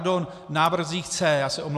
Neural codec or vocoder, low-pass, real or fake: none; 14.4 kHz; real